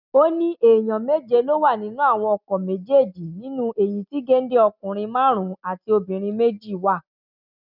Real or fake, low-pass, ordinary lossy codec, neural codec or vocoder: real; 5.4 kHz; none; none